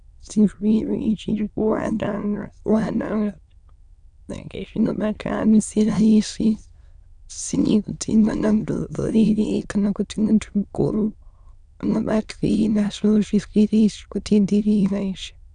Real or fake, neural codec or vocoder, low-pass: fake; autoencoder, 22.05 kHz, a latent of 192 numbers a frame, VITS, trained on many speakers; 9.9 kHz